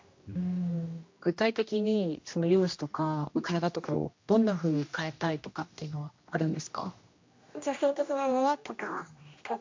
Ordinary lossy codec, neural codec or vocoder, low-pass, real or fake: MP3, 48 kbps; codec, 16 kHz, 1 kbps, X-Codec, HuBERT features, trained on general audio; 7.2 kHz; fake